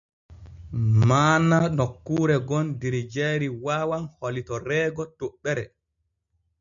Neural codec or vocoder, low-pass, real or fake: none; 7.2 kHz; real